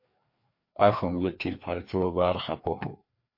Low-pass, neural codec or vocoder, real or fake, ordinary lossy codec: 5.4 kHz; codec, 16 kHz, 2 kbps, FreqCodec, larger model; fake; MP3, 32 kbps